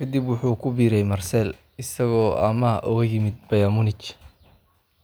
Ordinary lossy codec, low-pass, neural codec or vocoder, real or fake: none; none; none; real